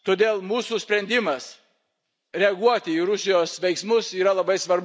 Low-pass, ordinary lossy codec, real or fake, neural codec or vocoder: none; none; real; none